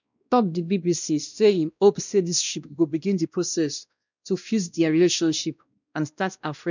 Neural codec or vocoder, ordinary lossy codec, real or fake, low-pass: codec, 16 kHz, 1 kbps, X-Codec, WavLM features, trained on Multilingual LibriSpeech; none; fake; 7.2 kHz